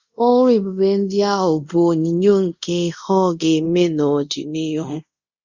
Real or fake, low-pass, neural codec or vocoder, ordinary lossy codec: fake; 7.2 kHz; codec, 24 kHz, 0.5 kbps, DualCodec; Opus, 64 kbps